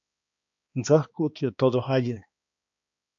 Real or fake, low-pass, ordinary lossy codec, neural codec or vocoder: fake; 7.2 kHz; AAC, 64 kbps; codec, 16 kHz, 2 kbps, X-Codec, HuBERT features, trained on balanced general audio